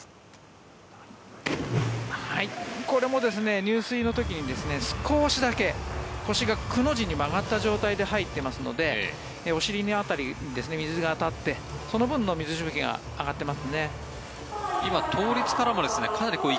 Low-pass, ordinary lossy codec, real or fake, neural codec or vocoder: none; none; real; none